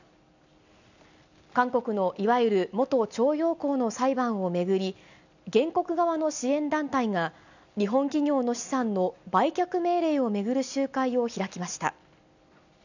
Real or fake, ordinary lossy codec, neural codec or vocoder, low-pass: real; none; none; 7.2 kHz